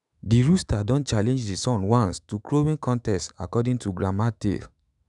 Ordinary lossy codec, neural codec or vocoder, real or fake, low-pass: none; autoencoder, 48 kHz, 128 numbers a frame, DAC-VAE, trained on Japanese speech; fake; 10.8 kHz